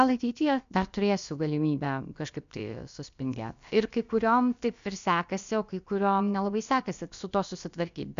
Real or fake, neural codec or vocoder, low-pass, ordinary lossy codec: fake; codec, 16 kHz, about 1 kbps, DyCAST, with the encoder's durations; 7.2 kHz; MP3, 64 kbps